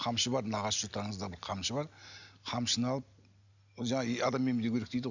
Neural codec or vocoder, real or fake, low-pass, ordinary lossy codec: none; real; 7.2 kHz; none